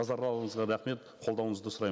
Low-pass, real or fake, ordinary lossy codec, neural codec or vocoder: none; real; none; none